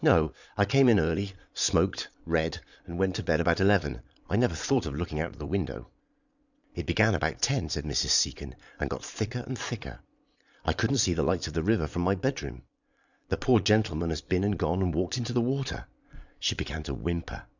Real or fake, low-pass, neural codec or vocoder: fake; 7.2 kHz; vocoder, 22.05 kHz, 80 mel bands, Vocos